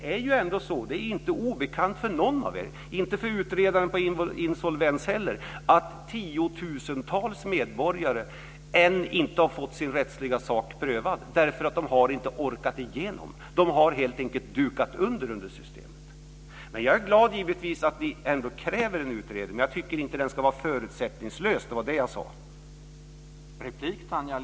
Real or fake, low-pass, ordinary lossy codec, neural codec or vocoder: real; none; none; none